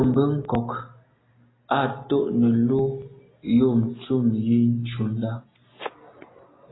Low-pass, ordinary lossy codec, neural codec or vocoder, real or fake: 7.2 kHz; AAC, 16 kbps; none; real